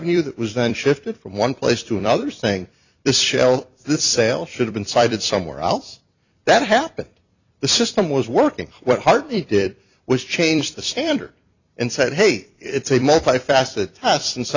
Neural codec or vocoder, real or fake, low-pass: vocoder, 44.1 kHz, 128 mel bands every 256 samples, BigVGAN v2; fake; 7.2 kHz